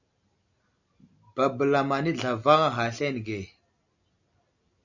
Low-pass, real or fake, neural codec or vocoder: 7.2 kHz; real; none